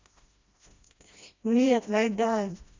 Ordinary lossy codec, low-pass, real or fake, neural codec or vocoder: MP3, 64 kbps; 7.2 kHz; fake; codec, 16 kHz, 1 kbps, FreqCodec, smaller model